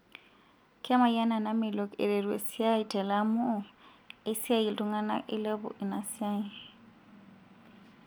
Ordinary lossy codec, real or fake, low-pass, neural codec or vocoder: none; real; none; none